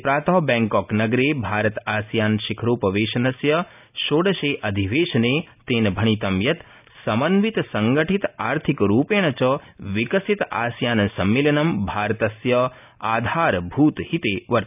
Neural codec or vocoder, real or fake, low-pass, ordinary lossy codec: none; real; 3.6 kHz; none